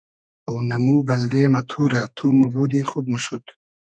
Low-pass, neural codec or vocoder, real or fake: 9.9 kHz; codec, 32 kHz, 1.9 kbps, SNAC; fake